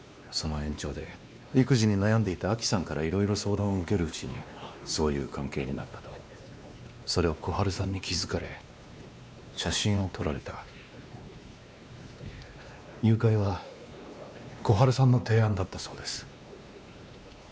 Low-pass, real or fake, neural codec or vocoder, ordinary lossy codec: none; fake; codec, 16 kHz, 2 kbps, X-Codec, WavLM features, trained on Multilingual LibriSpeech; none